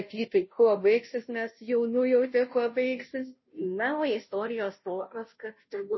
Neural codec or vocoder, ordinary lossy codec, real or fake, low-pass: codec, 16 kHz, 0.5 kbps, FunCodec, trained on Chinese and English, 25 frames a second; MP3, 24 kbps; fake; 7.2 kHz